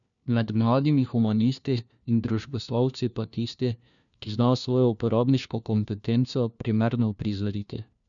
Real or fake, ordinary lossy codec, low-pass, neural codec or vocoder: fake; none; 7.2 kHz; codec, 16 kHz, 1 kbps, FunCodec, trained on LibriTTS, 50 frames a second